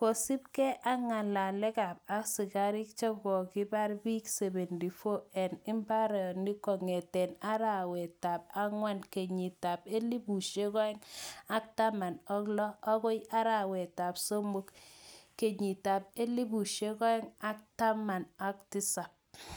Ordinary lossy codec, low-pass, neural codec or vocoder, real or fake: none; none; none; real